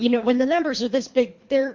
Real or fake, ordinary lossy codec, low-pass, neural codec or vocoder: fake; MP3, 48 kbps; 7.2 kHz; codec, 24 kHz, 3 kbps, HILCodec